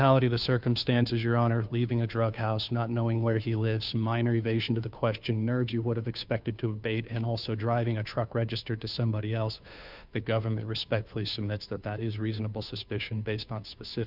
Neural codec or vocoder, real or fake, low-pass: codec, 16 kHz, 2 kbps, FunCodec, trained on Chinese and English, 25 frames a second; fake; 5.4 kHz